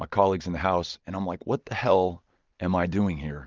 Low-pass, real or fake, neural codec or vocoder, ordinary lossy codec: 7.2 kHz; real; none; Opus, 32 kbps